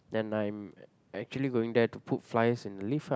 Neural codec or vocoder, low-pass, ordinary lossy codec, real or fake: none; none; none; real